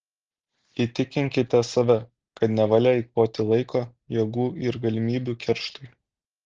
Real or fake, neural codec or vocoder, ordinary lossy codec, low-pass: real; none; Opus, 16 kbps; 7.2 kHz